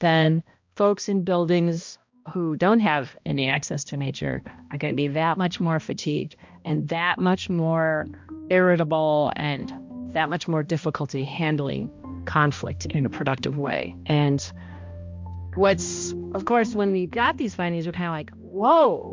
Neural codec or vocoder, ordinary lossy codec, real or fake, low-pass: codec, 16 kHz, 1 kbps, X-Codec, HuBERT features, trained on balanced general audio; MP3, 64 kbps; fake; 7.2 kHz